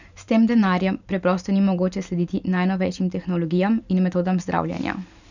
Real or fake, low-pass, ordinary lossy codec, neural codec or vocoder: fake; 7.2 kHz; none; vocoder, 44.1 kHz, 128 mel bands every 512 samples, BigVGAN v2